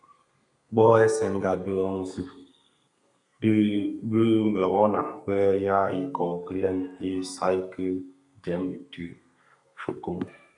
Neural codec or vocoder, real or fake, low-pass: codec, 32 kHz, 1.9 kbps, SNAC; fake; 10.8 kHz